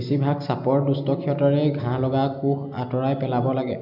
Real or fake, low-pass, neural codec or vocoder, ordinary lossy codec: real; 5.4 kHz; none; none